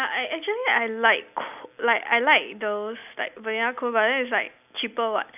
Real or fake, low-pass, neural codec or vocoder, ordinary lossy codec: real; 3.6 kHz; none; none